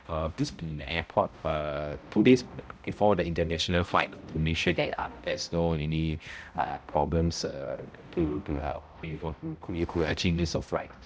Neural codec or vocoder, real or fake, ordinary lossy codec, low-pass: codec, 16 kHz, 0.5 kbps, X-Codec, HuBERT features, trained on balanced general audio; fake; none; none